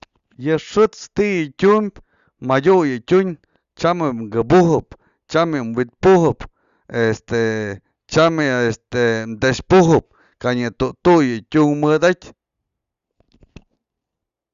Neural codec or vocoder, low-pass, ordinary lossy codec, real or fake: none; 7.2 kHz; Opus, 64 kbps; real